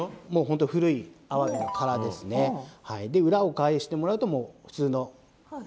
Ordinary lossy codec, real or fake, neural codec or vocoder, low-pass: none; real; none; none